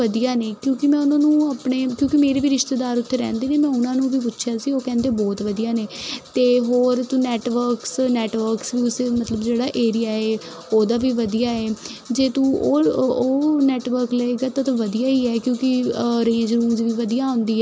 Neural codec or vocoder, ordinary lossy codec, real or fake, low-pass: none; none; real; none